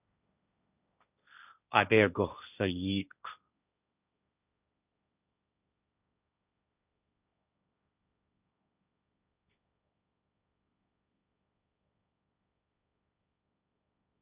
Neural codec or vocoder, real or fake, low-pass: codec, 16 kHz, 1.1 kbps, Voila-Tokenizer; fake; 3.6 kHz